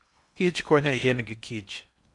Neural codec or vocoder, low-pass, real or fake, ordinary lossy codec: codec, 16 kHz in and 24 kHz out, 0.8 kbps, FocalCodec, streaming, 65536 codes; 10.8 kHz; fake; none